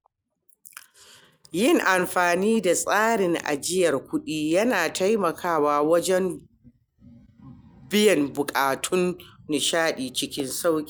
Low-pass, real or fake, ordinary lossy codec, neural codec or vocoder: none; real; none; none